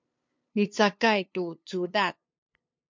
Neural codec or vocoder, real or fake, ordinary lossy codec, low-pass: codec, 16 kHz, 2 kbps, FunCodec, trained on LibriTTS, 25 frames a second; fake; MP3, 64 kbps; 7.2 kHz